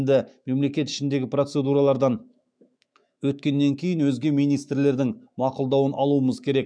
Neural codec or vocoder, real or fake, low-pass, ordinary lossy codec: autoencoder, 48 kHz, 128 numbers a frame, DAC-VAE, trained on Japanese speech; fake; 9.9 kHz; none